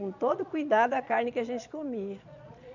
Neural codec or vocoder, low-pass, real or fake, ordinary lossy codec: vocoder, 22.05 kHz, 80 mel bands, Vocos; 7.2 kHz; fake; none